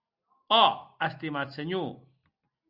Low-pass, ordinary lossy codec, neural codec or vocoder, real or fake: 5.4 kHz; Opus, 64 kbps; none; real